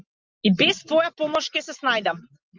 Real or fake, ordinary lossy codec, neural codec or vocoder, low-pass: real; Opus, 32 kbps; none; 7.2 kHz